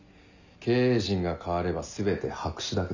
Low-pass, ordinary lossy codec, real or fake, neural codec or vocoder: 7.2 kHz; none; real; none